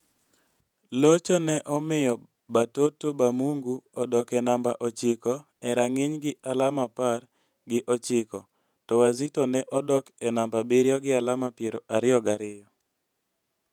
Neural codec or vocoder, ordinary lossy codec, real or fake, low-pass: vocoder, 48 kHz, 128 mel bands, Vocos; none; fake; 19.8 kHz